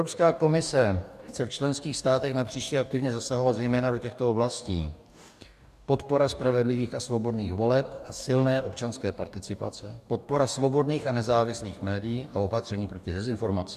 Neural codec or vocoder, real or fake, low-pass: codec, 44.1 kHz, 2.6 kbps, DAC; fake; 14.4 kHz